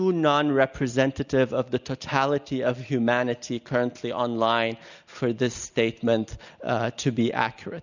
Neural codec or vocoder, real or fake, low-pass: none; real; 7.2 kHz